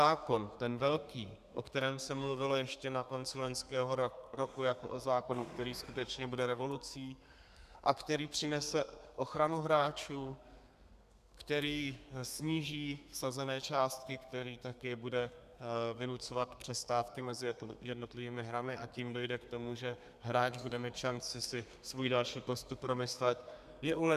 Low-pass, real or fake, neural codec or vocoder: 14.4 kHz; fake; codec, 32 kHz, 1.9 kbps, SNAC